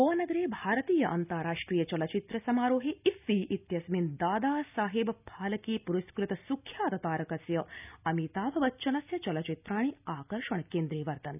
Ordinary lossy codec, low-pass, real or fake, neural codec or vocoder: none; 3.6 kHz; real; none